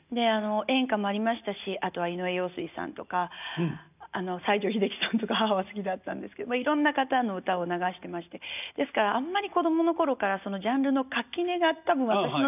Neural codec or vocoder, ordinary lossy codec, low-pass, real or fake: none; none; 3.6 kHz; real